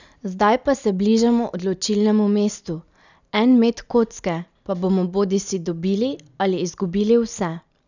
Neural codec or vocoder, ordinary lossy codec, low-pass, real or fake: none; none; 7.2 kHz; real